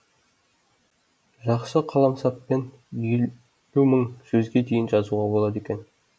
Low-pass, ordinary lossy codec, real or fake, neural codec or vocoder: none; none; real; none